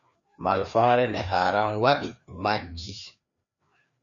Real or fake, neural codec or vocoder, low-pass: fake; codec, 16 kHz, 2 kbps, FreqCodec, larger model; 7.2 kHz